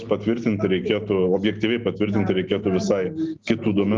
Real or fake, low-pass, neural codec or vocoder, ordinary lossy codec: real; 7.2 kHz; none; Opus, 32 kbps